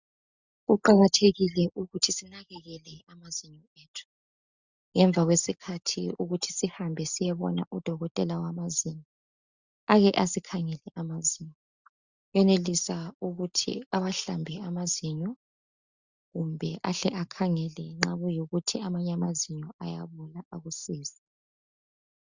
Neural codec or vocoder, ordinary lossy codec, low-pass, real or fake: none; Opus, 64 kbps; 7.2 kHz; real